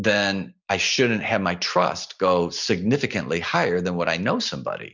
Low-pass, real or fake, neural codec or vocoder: 7.2 kHz; real; none